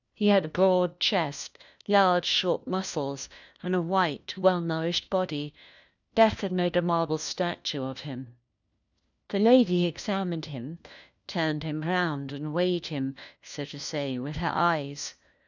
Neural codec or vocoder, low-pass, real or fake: codec, 16 kHz, 1 kbps, FunCodec, trained on LibriTTS, 50 frames a second; 7.2 kHz; fake